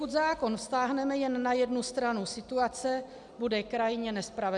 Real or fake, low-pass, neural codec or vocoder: real; 10.8 kHz; none